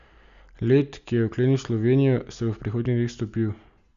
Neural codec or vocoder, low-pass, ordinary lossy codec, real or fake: none; 7.2 kHz; Opus, 64 kbps; real